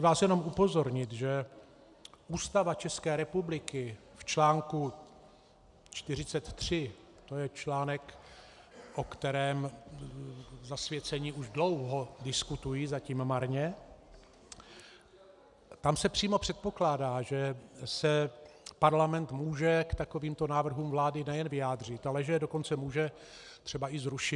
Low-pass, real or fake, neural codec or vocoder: 10.8 kHz; real; none